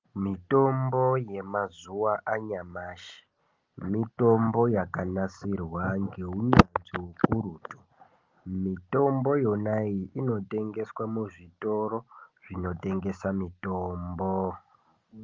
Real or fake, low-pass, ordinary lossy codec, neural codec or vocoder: real; 7.2 kHz; Opus, 32 kbps; none